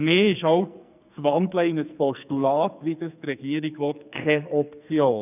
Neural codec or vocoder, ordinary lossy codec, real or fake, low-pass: codec, 44.1 kHz, 2.6 kbps, SNAC; none; fake; 3.6 kHz